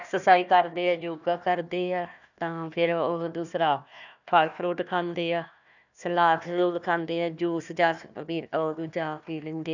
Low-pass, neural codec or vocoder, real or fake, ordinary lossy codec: 7.2 kHz; codec, 16 kHz, 1 kbps, FunCodec, trained on Chinese and English, 50 frames a second; fake; none